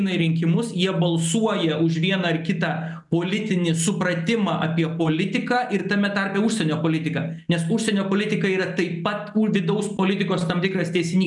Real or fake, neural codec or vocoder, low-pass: real; none; 10.8 kHz